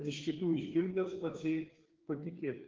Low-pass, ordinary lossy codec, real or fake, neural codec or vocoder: 7.2 kHz; Opus, 16 kbps; fake; codec, 16 kHz, 2 kbps, FreqCodec, larger model